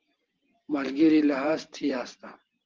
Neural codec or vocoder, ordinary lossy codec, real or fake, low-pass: vocoder, 44.1 kHz, 128 mel bands every 512 samples, BigVGAN v2; Opus, 16 kbps; fake; 7.2 kHz